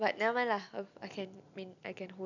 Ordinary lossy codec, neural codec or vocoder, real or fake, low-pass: none; none; real; 7.2 kHz